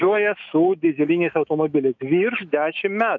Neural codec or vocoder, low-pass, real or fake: autoencoder, 48 kHz, 128 numbers a frame, DAC-VAE, trained on Japanese speech; 7.2 kHz; fake